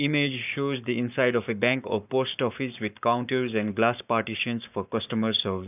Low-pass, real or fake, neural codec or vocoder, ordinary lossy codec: 3.6 kHz; fake; codec, 44.1 kHz, 7.8 kbps, Pupu-Codec; none